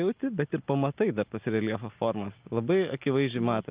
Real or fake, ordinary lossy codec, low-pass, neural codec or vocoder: real; Opus, 24 kbps; 3.6 kHz; none